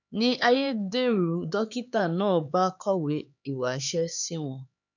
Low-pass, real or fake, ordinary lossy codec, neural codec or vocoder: 7.2 kHz; fake; none; codec, 16 kHz, 4 kbps, X-Codec, HuBERT features, trained on LibriSpeech